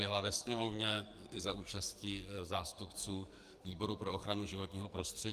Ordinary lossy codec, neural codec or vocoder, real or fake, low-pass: Opus, 32 kbps; codec, 44.1 kHz, 2.6 kbps, SNAC; fake; 14.4 kHz